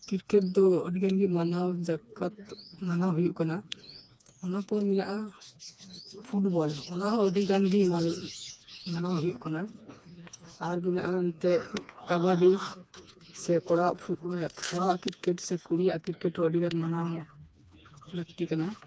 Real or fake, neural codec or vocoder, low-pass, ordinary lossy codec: fake; codec, 16 kHz, 2 kbps, FreqCodec, smaller model; none; none